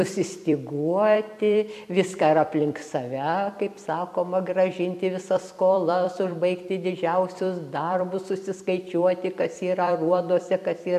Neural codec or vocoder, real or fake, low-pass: vocoder, 44.1 kHz, 128 mel bands every 256 samples, BigVGAN v2; fake; 14.4 kHz